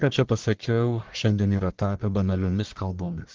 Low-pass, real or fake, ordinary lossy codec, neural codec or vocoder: 7.2 kHz; fake; Opus, 16 kbps; codec, 44.1 kHz, 1.7 kbps, Pupu-Codec